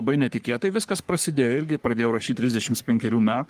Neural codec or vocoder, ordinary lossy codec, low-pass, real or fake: codec, 44.1 kHz, 3.4 kbps, Pupu-Codec; Opus, 32 kbps; 14.4 kHz; fake